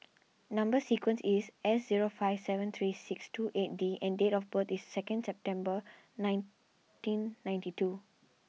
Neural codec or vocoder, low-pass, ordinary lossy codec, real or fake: none; none; none; real